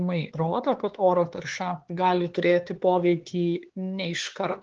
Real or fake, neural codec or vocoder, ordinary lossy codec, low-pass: fake; codec, 16 kHz, 4 kbps, X-Codec, HuBERT features, trained on LibriSpeech; Opus, 16 kbps; 7.2 kHz